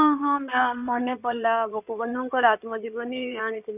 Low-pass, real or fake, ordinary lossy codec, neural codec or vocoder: 3.6 kHz; fake; none; codec, 16 kHz in and 24 kHz out, 2.2 kbps, FireRedTTS-2 codec